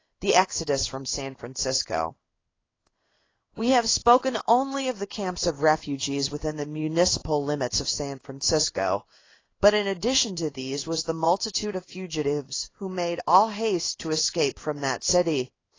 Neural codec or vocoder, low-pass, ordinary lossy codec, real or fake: codec, 16 kHz in and 24 kHz out, 1 kbps, XY-Tokenizer; 7.2 kHz; AAC, 32 kbps; fake